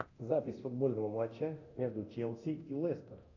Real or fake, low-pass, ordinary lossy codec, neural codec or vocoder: fake; 7.2 kHz; AAC, 48 kbps; codec, 24 kHz, 0.9 kbps, DualCodec